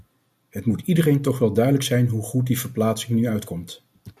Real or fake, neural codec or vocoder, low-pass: real; none; 14.4 kHz